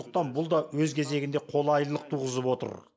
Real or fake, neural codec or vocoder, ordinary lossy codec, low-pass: real; none; none; none